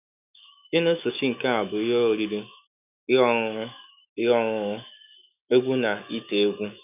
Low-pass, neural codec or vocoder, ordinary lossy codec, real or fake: 3.6 kHz; autoencoder, 48 kHz, 128 numbers a frame, DAC-VAE, trained on Japanese speech; none; fake